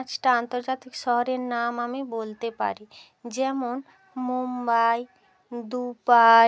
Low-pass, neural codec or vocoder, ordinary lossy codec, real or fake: none; none; none; real